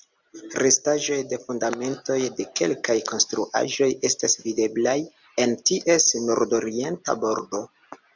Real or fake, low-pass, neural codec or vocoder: real; 7.2 kHz; none